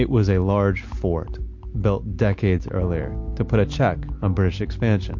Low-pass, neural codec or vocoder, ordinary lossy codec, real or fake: 7.2 kHz; none; MP3, 48 kbps; real